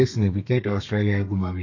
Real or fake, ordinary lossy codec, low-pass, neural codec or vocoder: fake; none; 7.2 kHz; codec, 32 kHz, 1.9 kbps, SNAC